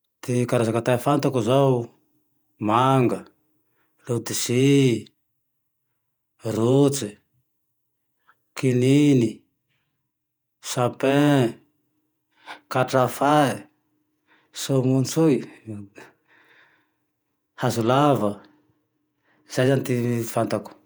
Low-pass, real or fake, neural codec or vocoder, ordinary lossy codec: none; fake; vocoder, 48 kHz, 128 mel bands, Vocos; none